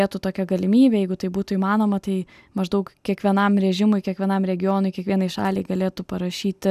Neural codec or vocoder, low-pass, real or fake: none; 14.4 kHz; real